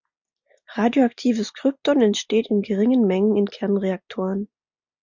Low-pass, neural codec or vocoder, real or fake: 7.2 kHz; none; real